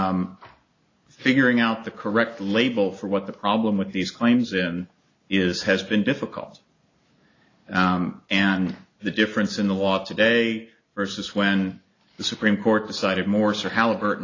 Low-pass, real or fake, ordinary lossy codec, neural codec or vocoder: 7.2 kHz; real; AAC, 32 kbps; none